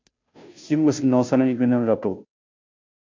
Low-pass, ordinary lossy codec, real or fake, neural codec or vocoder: 7.2 kHz; MP3, 48 kbps; fake; codec, 16 kHz, 0.5 kbps, FunCodec, trained on Chinese and English, 25 frames a second